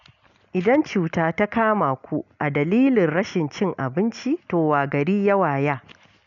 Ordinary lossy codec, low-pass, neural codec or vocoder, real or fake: none; 7.2 kHz; none; real